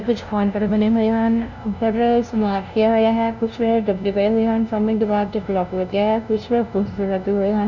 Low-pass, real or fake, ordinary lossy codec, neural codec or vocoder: 7.2 kHz; fake; none; codec, 16 kHz, 0.5 kbps, FunCodec, trained on LibriTTS, 25 frames a second